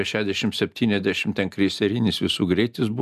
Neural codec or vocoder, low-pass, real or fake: none; 14.4 kHz; real